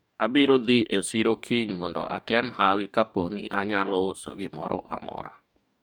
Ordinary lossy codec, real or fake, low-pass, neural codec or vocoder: none; fake; none; codec, 44.1 kHz, 2.6 kbps, DAC